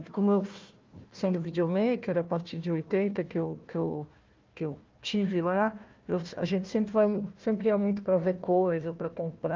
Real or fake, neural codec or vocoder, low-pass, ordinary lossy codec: fake; codec, 16 kHz, 1 kbps, FunCodec, trained on Chinese and English, 50 frames a second; 7.2 kHz; Opus, 24 kbps